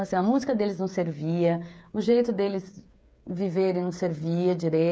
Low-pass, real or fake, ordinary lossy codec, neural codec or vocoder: none; fake; none; codec, 16 kHz, 8 kbps, FreqCodec, smaller model